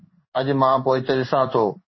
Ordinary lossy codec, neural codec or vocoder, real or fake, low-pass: MP3, 24 kbps; codec, 16 kHz in and 24 kHz out, 1 kbps, XY-Tokenizer; fake; 7.2 kHz